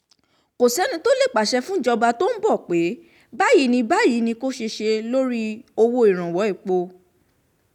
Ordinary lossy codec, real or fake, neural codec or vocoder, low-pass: none; real; none; 19.8 kHz